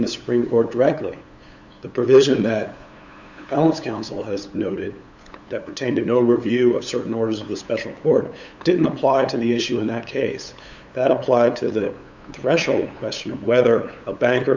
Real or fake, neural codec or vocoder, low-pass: fake; codec, 16 kHz, 8 kbps, FunCodec, trained on LibriTTS, 25 frames a second; 7.2 kHz